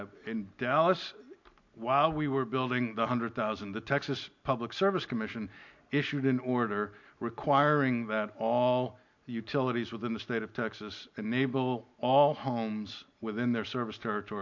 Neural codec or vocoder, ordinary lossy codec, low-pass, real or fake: none; MP3, 48 kbps; 7.2 kHz; real